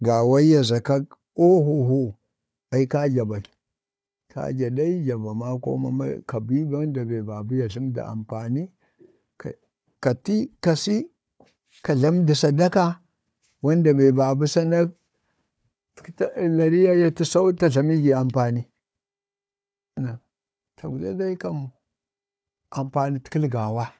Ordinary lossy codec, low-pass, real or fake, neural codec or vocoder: none; none; fake; codec, 16 kHz, 4 kbps, FunCodec, trained on Chinese and English, 50 frames a second